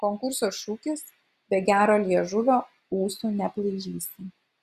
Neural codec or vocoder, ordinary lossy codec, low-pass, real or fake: none; Opus, 64 kbps; 14.4 kHz; real